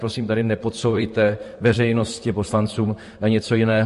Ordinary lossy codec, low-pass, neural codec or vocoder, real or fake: MP3, 48 kbps; 14.4 kHz; vocoder, 44.1 kHz, 128 mel bands, Pupu-Vocoder; fake